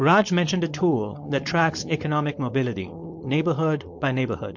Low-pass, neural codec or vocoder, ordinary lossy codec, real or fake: 7.2 kHz; codec, 16 kHz, 4.8 kbps, FACodec; MP3, 48 kbps; fake